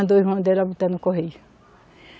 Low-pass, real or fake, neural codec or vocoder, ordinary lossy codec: none; real; none; none